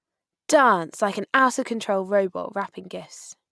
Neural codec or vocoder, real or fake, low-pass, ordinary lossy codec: none; real; none; none